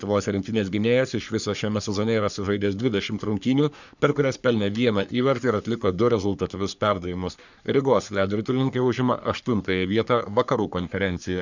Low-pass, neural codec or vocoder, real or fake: 7.2 kHz; codec, 44.1 kHz, 3.4 kbps, Pupu-Codec; fake